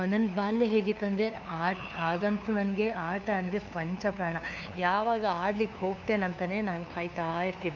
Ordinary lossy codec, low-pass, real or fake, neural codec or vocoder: none; 7.2 kHz; fake; codec, 16 kHz, 2 kbps, FunCodec, trained on LibriTTS, 25 frames a second